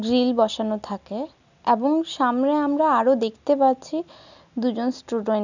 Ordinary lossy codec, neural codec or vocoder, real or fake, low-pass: none; none; real; 7.2 kHz